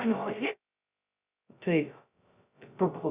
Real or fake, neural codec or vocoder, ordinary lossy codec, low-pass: fake; codec, 16 kHz, 0.2 kbps, FocalCodec; Opus, 64 kbps; 3.6 kHz